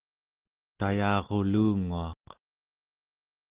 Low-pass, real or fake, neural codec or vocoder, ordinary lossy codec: 3.6 kHz; real; none; Opus, 16 kbps